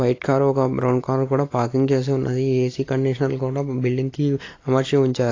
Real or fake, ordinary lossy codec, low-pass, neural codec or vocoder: real; AAC, 32 kbps; 7.2 kHz; none